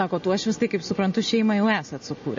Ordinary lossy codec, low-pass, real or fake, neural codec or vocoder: MP3, 32 kbps; 7.2 kHz; real; none